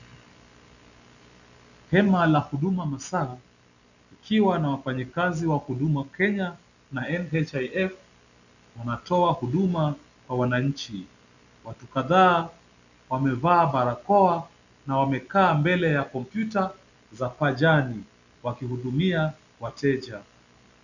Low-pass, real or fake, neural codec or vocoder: 7.2 kHz; real; none